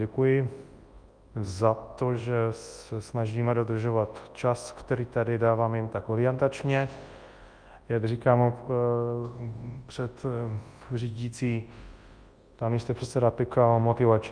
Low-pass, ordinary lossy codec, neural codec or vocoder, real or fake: 9.9 kHz; Opus, 32 kbps; codec, 24 kHz, 0.9 kbps, WavTokenizer, large speech release; fake